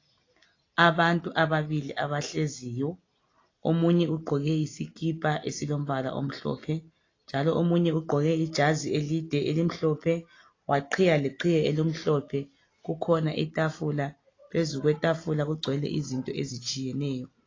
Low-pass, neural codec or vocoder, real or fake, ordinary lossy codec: 7.2 kHz; none; real; AAC, 32 kbps